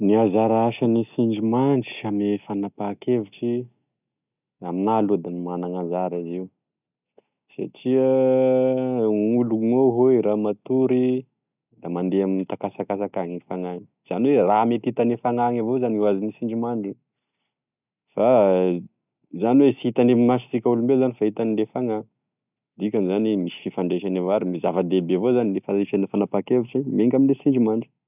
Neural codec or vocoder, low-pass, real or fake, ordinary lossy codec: none; 3.6 kHz; real; none